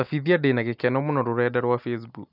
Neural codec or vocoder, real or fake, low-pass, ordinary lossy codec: none; real; 5.4 kHz; none